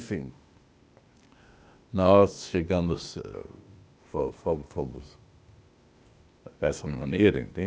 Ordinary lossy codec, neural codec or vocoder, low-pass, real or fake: none; codec, 16 kHz, 0.8 kbps, ZipCodec; none; fake